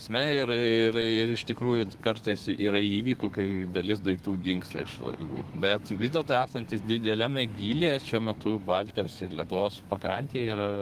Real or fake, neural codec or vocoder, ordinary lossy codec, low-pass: fake; codec, 32 kHz, 1.9 kbps, SNAC; Opus, 32 kbps; 14.4 kHz